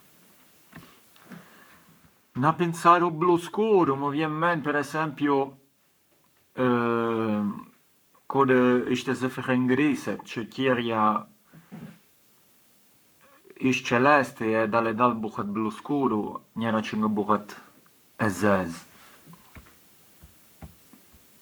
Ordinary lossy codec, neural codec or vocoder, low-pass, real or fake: none; codec, 44.1 kHz, 7.8 kbps, Pupu-Codec; none; fake